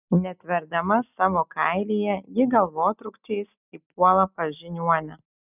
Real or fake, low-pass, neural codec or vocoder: real; 3.6 kHz; none